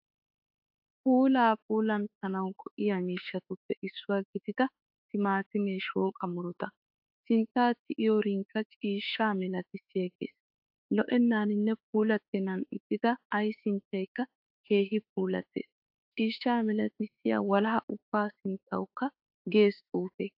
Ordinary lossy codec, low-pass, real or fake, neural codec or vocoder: AAC, 48 kbps; 5.4 kHz; fake; autoencoder, 48 kHz, 32 numbers a frame, DAC-VAE, trained on Japanese speech